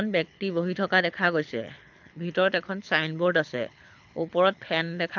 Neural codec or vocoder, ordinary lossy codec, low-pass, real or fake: codec, 24 kHz, 6 kbps, HILCodec; none; 7.2 kHz; fake